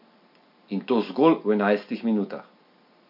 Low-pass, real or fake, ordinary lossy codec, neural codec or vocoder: 5.4 kHz; real; MP3, 48 kbps; none